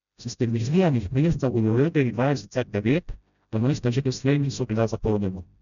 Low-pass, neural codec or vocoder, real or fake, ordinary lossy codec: 7.2 kHz; codec, 16 kHz, 0.5 kbps, FreqCodec, smaller model; fake; none